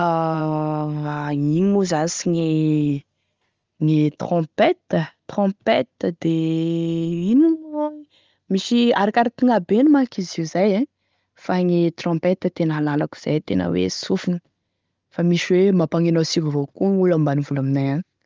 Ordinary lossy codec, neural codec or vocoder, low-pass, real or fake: Opus, 32 kbps; none; 7.2 kHz; real